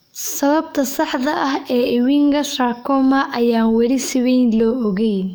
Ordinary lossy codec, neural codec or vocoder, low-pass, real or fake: none; codec, 44.1 kHz, 7.8 kbps, DAC; none; fake